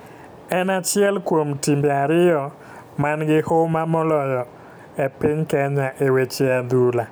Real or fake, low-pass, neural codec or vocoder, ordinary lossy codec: real; none; none; none